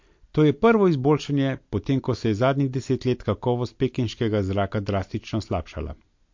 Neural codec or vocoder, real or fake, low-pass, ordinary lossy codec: none; real; 7.2 kHz; MP3, 48 kbps